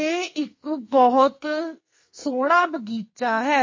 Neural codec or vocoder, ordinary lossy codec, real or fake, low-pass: codec, 44.1 kHz, 2.6 kbps, SNAC; MP3, 32 kbps; fake; 7.2 kHz